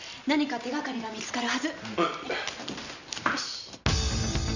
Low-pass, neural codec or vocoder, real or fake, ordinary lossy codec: 7.2 kHz; none; real; none